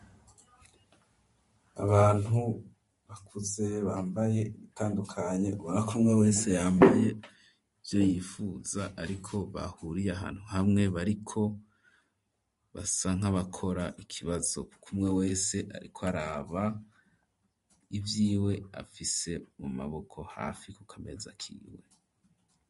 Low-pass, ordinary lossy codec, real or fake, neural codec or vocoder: 14.4 kHz; MP3, 48 kbps; fake; vocoder, 44.1 kHz, 128 mel bands every 512 samples, BigVGAN v2